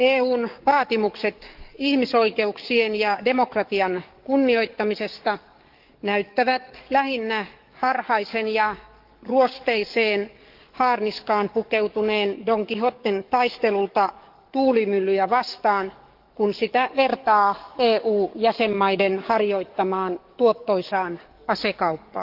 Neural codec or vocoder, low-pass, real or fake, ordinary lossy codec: codec, 44.1 kHz, 7.8 kbps, DAC; 5.4 kHz; fake; Opus, 24 kbps